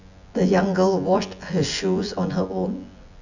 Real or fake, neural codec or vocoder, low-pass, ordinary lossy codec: fake; vocoder, 24 kHz, 100 mel bands, Vocos; 7.2 kHz; none